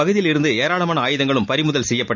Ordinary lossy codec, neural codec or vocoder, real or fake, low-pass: none; none; real; 7.2 kHz